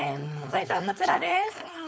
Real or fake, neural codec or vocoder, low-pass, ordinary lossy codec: fake; codec, 16 kHz, 4.8 kbps, FACodec; none; none